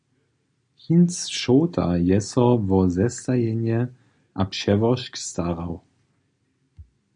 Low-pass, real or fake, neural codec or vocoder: 9.9 kHz; real; none